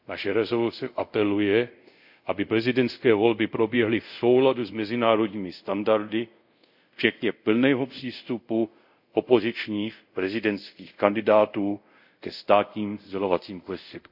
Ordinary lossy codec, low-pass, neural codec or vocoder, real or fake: none; 5.4 kHz; codec, 24 kHz, 0.5 kbps, DualCodec; fake